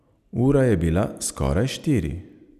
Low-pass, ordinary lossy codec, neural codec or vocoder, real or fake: 14.4 kHz; none; none; real